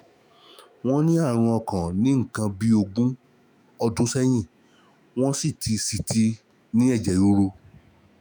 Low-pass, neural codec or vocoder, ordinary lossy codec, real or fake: none; autoencoder, 48 kHz, 128 numbers a frame, DAC-VAE, trained on Japanese speech; none; fake